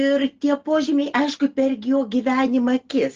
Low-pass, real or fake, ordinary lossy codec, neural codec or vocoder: 7.2 kHz; real; Opus, 16 kbps; none